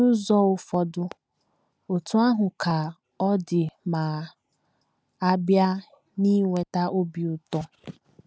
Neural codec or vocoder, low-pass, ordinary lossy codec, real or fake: none; none; none; real